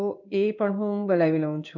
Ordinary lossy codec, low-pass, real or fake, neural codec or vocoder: none; 7.2 kHz; fake; codec, 16 kHz in and 24 kHz out, 1 kbps, XY-Tokenizer